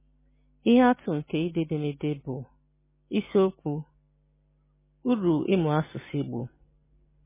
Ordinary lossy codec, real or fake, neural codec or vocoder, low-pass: MP3, 16 kbps; real; none; 3.6 kHz